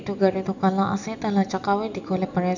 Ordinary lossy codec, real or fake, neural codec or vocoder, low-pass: none; real; none; 7.2 kHz